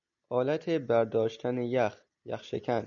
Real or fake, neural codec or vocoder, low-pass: real; none; 7.2 kHz